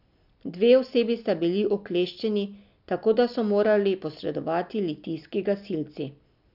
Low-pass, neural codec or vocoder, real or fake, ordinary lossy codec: 5.4 kHz; none; real; none